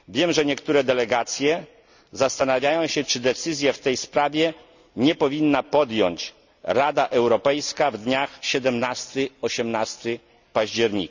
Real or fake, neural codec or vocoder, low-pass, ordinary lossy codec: real; none; 7.2 kHz; Opus, 64 kbps